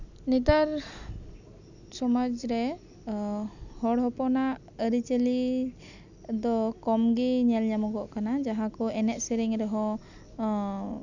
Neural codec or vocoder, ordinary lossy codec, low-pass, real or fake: none; none; 7.2 kHz; real